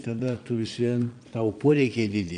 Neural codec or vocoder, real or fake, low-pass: vocoder, 22.05 kHz, 80 mel bands, Vocos; fake; 9.9 kHz